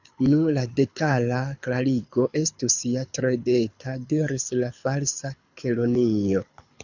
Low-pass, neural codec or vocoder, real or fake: 7.2 kHz; codec, 24 kHz, 6 kbps, HILCodec; fake